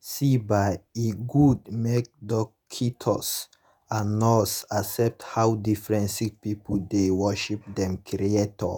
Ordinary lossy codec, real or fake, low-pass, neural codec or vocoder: none; fake; none; vocoder, 48 kHz, 128 mel bands, Vocos